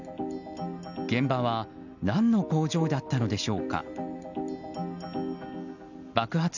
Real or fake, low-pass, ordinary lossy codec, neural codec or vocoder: real; 7.2 kHz; none; none